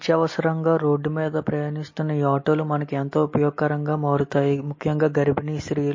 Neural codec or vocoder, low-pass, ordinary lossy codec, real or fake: none; 7.2 kHz; MP3, 32 kbps; real